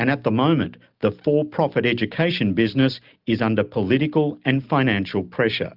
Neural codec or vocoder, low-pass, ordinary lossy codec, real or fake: none; 5.4 kHz; Opus, 24 kbps; real